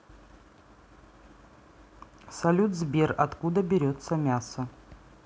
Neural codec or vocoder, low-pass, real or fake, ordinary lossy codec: none; none; real; none